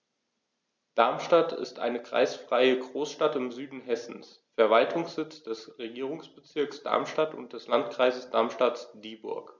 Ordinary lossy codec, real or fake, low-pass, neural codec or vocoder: none; real; none; none